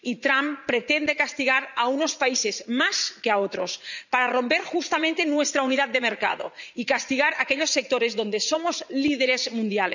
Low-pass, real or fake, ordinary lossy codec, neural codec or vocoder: 7.2 kHz; fake; none; vocoder, 44.1 kHz, 80 mel bands, Vocos